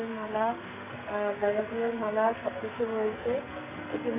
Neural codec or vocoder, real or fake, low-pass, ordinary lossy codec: codec, 44.1 kHz, 2.6 kbps, SNAC; fake; 3.6 kHz; none